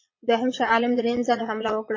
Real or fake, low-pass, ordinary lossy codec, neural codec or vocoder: fake; 7.2 kHz; MP3, 32 kbps; vocoder, 22.05 kHz, 80 mel bands, Vocos